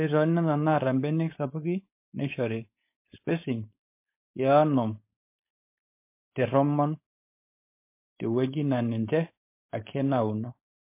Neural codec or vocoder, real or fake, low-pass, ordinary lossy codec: codec, 16 kHz, 4.8 kbps, FACodec; fake; 3.6 kHz; MP3, 24 kbps